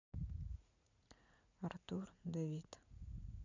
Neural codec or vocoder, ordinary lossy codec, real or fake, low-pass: vocoder, 44.1 kHz, 80 mel bands, Vocos; none; fake; 7.2 kHz